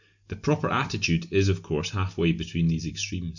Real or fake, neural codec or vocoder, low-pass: real; none; 7.2 kHz